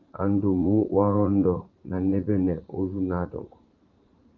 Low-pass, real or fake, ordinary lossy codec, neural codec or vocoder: 7.2 kHz; fake; Opus, 32 kbps; vocoder, 44.1 kHz, 80 mel bands, Vocos